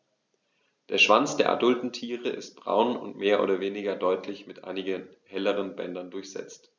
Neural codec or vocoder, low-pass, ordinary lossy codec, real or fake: none; none; none; real